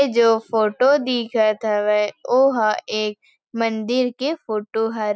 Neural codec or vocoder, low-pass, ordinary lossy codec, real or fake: none; none; none; real